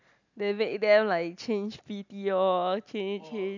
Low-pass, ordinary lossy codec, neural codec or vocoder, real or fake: 7.2 kHz; none; none; real